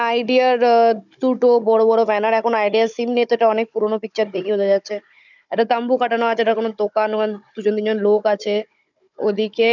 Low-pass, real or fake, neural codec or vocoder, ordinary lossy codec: 7.2 kHz; fake; codec, 16 kHz, 16 kbps, FunCodec, trained on Chinese and English, 50 frames a second; none